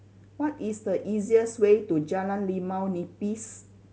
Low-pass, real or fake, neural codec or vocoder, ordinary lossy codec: none; real; none; none